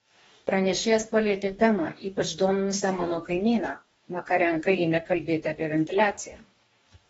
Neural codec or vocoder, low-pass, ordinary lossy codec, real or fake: codec, 44.1 kHz, 2.6 kbps, DAC; 19.8 kHz; AAC, 24 kbps; fake